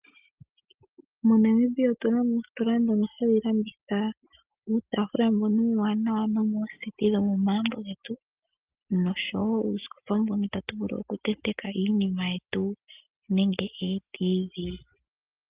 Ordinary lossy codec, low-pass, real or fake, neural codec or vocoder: Opus, 32 kbps; 3.6 kHz; real; none